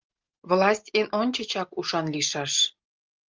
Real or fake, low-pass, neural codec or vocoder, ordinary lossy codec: real; 7.2 kHz; none; Opus, 24 kbps